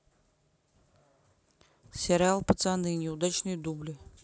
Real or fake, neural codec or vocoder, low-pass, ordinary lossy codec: real; none; none; none